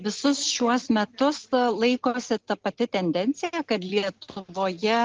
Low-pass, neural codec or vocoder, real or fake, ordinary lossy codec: 7.2 kHz; none; real; Opus, 16 kbps